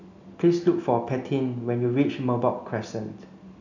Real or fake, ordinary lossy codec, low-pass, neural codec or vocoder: real; none; 7.2 kHz; none